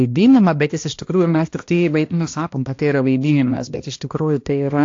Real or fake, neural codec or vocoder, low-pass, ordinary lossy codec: fake; codec, 16 kHz, 1 kbps, X-Codec, HuBERT features, trained on balanced general audio; 7.2 kHz; AAC, 48 kbps